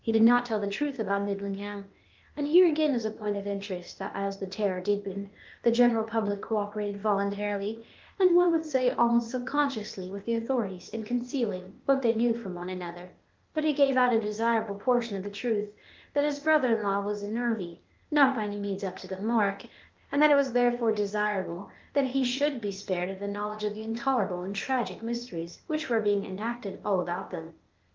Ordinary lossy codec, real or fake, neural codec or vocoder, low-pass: Opus, 32 kbps; fake; codec, 16 kHz, 0.8 kbps, ZipCodec; 7.2 kHz